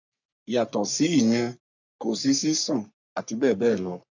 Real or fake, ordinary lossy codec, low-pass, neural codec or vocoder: fake; none; 7.2 kHz; codec, 44.1 kHz, 3.4 kbps, Pupu-Codec